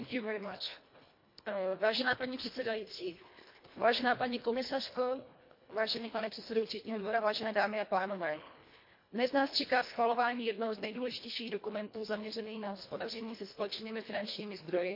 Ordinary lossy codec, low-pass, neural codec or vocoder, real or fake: MP3, 32 kbps; 5.4 kHz; codec, 24 kHz, 1.5 kbps, HILCodec; fake